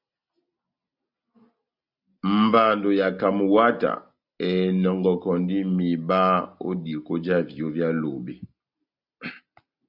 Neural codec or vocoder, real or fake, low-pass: none; real; 5.4 kHz